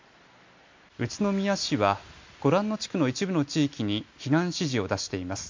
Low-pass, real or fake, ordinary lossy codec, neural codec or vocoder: 7.2 kHz; real; MP3, 48 kbps; none